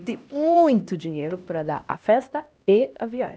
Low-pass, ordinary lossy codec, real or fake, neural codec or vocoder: none; none; fake; codec, 16 kHz, 1 kbps, X-Codec, HuBERT features, trained on LibriSpeech